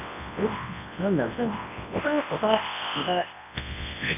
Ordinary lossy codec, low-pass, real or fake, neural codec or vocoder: none; 3.6 kHz; fake; codec, 24 kHz, 0.9 kbps, WavTokenizer, large speech release